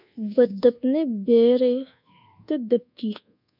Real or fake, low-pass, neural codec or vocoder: fake; 5.4 kHz; codec, 24 kHz, 1.2 kbps, DualCodec